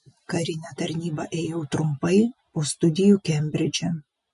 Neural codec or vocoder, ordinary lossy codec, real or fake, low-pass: none; MP3, 48 kbps; real; 14.4 kHz